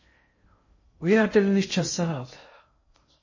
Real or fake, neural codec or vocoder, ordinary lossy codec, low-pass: fake; codec, 16 kHz in and 24 kHz out, 0.6 kbps, FocalCodec, streaming, 2048 codes; MP3, 32 kbps; 7.2 kHz